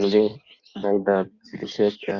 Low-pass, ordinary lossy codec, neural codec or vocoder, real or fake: 7.2 kHz; Opus, 64 kbps; codec, 16 kHz in and 24 kHz out, 1.1 kbps, FireRedTTS-2 codec; fake